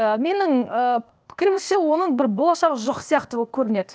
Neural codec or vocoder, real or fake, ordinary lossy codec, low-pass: codec, 16 kHz, 0.8 kbps, ZipCodec; fake; none; none